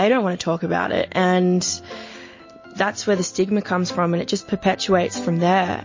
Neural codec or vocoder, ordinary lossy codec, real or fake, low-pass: none; MP3, 32 kbps; real; 7.2 kHz